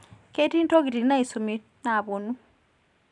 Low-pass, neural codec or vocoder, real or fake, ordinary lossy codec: 10.8 kHz; vocoder, 44.1 kHz, 128 mel bands every 512 samples, BigVGAN v2; fake; none